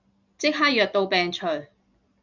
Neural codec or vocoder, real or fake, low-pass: none; real; 7.2 kHz